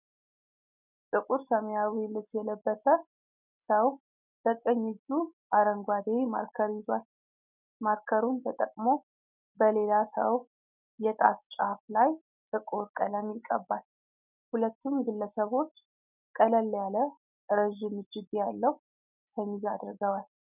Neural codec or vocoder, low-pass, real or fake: none; 3.6 kHz; real